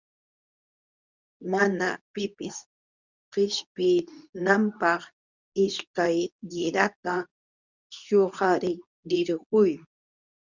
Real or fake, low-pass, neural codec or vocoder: fake; 7.2 kHz; codec, 24 kHz, 0.9 kbps, WavTokenizer, medium speech release version 2